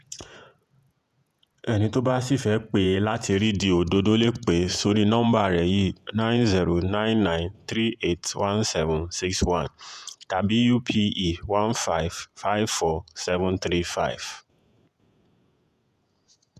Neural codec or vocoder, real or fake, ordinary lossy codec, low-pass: vocoder, 48 kHz, 128 mel bands, Vocos; fake; none; 14.4 kHz